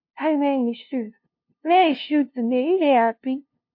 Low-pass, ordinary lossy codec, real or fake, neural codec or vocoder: 5.4 kHz; MP3, 32 kbps; fake; codec, 16 kHz, 0.5 kbps, FunCodec, trained on LibriTTS, 25 frames a second